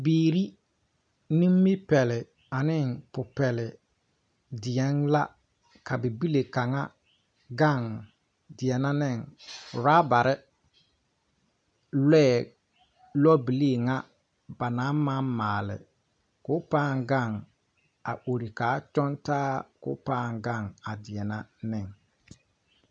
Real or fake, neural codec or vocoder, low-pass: real; none; 9.9 kHz